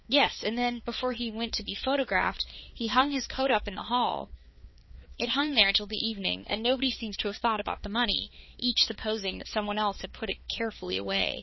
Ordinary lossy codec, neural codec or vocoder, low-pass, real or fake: MP3, 24 kbps; codec, 16 kHz, 4 kbps, X-Codec, HuBERT features, trained on balanced general audio; 7.2 kHz; fake